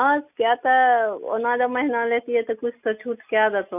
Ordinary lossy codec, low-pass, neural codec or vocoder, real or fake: none; 3.6 kHz; none; real